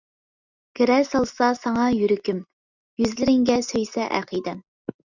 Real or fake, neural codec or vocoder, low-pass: real; none; 7.2 kHz